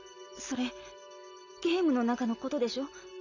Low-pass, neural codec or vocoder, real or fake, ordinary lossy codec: 7.2 kHz; none; real; none